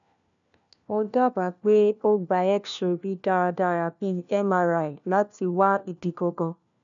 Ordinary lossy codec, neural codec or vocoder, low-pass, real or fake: none; codec, 16 kHz, 1 kbps, FunCodec, trained on LibriTTS, 50 frames a second; 7.2 kHz; fake